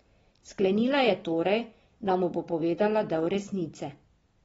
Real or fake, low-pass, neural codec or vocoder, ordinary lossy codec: real; 9.9 kHz; none; AAC, 24 kbps